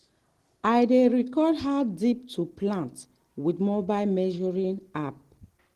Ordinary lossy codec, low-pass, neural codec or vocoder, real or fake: Opus, 16 kbps; 14.4 kHz; none; real